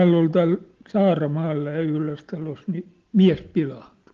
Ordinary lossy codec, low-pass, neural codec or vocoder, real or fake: Opus, 16 kbps; 7.2 kHz; none; real